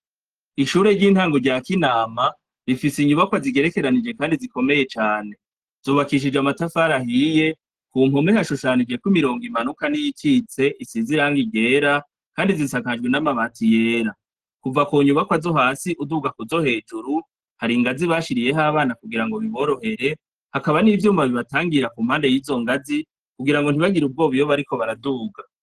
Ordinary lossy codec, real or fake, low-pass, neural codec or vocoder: Opus, 16 kbps; real; 14.4 kHz; none